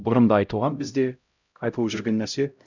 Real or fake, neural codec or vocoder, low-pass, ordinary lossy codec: fake; codec, 16 kHz, 0.5 kbps, X-Codec, HuBERT features, trained on LibriSpeech; 7.2 kHz; none